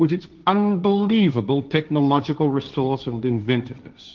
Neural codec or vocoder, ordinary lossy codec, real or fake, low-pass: codec, 16 kHz, 1.1 kbps, Voila-Tokenizer; Opus, 24 kbps; fake; 7.2 kHz